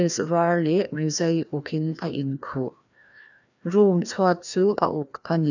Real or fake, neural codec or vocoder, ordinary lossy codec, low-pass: fake; codec, 16 kHz, 1 kbps, FreqCodec, larger model; none; 7.2 kHz